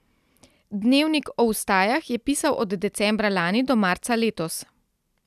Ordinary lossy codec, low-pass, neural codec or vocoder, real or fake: none; 14.4 kHz; none; real